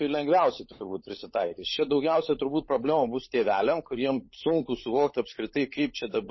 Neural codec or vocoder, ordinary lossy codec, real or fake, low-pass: codec, 16 kHz, 16 kbps, FunCodec, trained on LibriTTS, 50 frames a second; MP3, 24 kbps; fake; 7.2 kHz